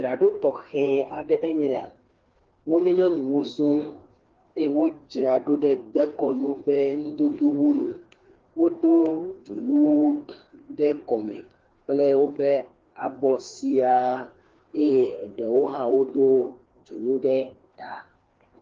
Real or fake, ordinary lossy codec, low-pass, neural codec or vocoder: fake; Opus, 16 kbps; 7.2 kHz; codec, 16 kHz, 2 kbps, FreqCodec, larger model